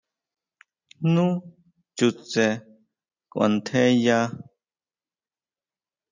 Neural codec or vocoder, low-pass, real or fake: none; 7.2 kHz; real